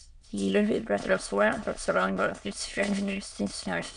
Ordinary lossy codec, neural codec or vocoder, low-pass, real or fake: MP3, 96 kbps; autoencoder, 22.05 kHz, a latent of 192 numbers a frame, VITS, trained on many speakers; 9.9 kHz; fake